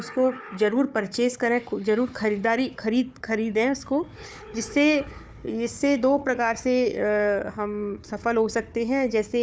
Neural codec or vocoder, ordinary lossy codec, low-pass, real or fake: codec, 16 kHz, 4 kbps, FunCodec, trained on Chinese and English, 50 frames a second; none; none; fake